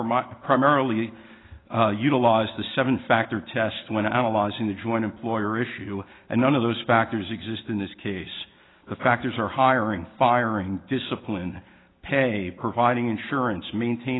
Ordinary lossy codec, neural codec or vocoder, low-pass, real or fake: AAC, 16 kbps; none; 7.2 kHz; real